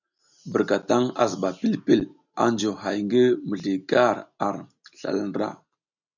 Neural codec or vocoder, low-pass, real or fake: none; 7.2 kHz; real